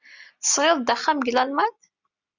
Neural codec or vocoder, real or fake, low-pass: none; real; 7.2 kHz